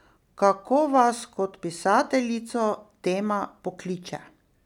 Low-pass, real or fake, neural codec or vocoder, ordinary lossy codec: 19.8 kHz; real; none; none